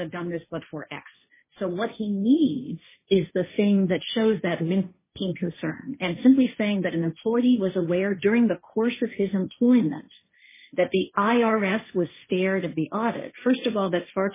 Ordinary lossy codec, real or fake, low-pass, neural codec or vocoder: MP3, 16 kbps; fake; 3.6 kHz; codec, 16 kHz, 1.1 kbps, Voila-Tokenizer